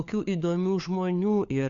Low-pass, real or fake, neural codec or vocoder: 7.2 kHz; fake; codec, 16 kHz, 4 kbps, FunCodec, trained on LibriTTS, 50 frames a second